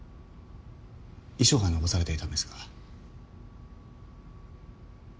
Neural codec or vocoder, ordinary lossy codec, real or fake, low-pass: none; none; real; none